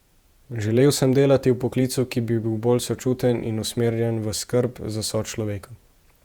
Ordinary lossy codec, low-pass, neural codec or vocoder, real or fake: Opus, 64 kbps; 19.8 kHz; vocoder, 48 kHz, 128 mel bands, Vocos; fake